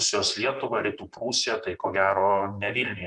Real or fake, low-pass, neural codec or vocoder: fake; 10.8 kHz; vocoder, 44.1 kHz, 128 mel bands, Pupu-Vocoder